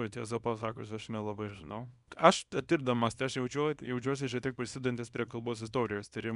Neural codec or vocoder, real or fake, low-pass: codec, 24 kHz, 0.9 kbps, WavTokenizer, small release; fake; 10.8 kHz